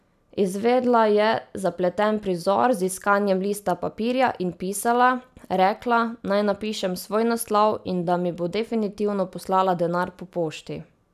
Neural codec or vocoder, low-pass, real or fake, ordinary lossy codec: none; 14.4 kHz; real; none